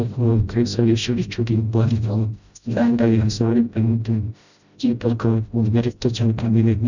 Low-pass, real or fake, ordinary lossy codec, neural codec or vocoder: 7.2 kHz; fake; none; codec, 16 kHz, 0.5 kbps, FreqCodec, smaller model